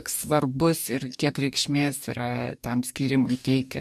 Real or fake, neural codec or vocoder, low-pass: fake; codec, 44.1 kHz, 2.6 kbps, DAC; 14.4 kHz